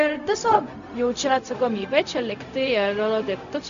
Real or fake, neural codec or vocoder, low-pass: fake; codec, 16 kHz, 0.4 kbps, LongCat-Audio-Codec; 7.2 kHz